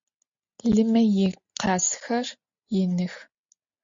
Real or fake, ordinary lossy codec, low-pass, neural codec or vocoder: real; MP3, 48 kbps; 7.2 kHz; none